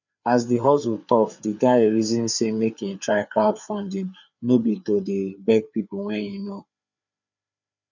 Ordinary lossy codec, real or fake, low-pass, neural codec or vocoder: none; fake; 7.2 kHz; codec, 16 kHz, 4 kbps, FreqCodec, larger model